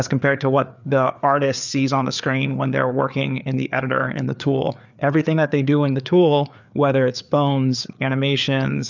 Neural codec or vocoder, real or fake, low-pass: codec, 16 kHz, 4 kbps, FreqCodec, larger model; fake; 7.2 kHz